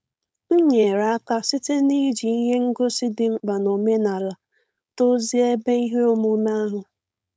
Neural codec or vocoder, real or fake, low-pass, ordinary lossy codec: codec, 16 kHz, 4.8 kbps, FACodec; fake; none; none